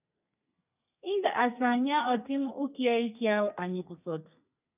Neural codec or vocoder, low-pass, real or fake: codec, 32 kHz, 1.9 kbps, SNAC; 3.6 kHz; fake